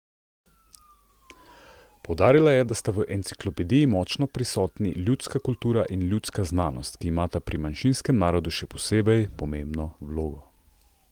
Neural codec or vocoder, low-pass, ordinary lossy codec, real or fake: none; 19.8 kHz; Opus, 24 kbps; real